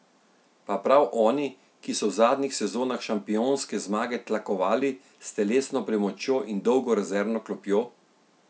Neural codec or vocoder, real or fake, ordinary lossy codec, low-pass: none; real; none; none